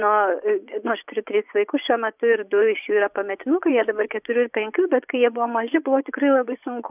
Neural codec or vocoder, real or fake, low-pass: vocoder, 24 kHz, 100 mel bands, Vocos; fake; 3.6 kHz